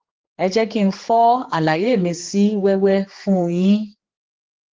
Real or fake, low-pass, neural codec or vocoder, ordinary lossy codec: fake; 7.2 kHz; codec, 16 kHz, 4 kbps, X-Codec, HuBERT features, trained on general audio; Opus, 16 kbps